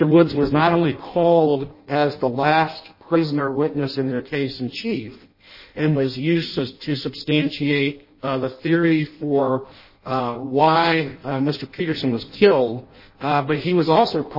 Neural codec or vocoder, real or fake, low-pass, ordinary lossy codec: codec, 16 kHz in and 24 kHz out, 0.6 kbps, FireRedTTS-2 codec; fake; 5.4 kHz; MP3, 24 kbps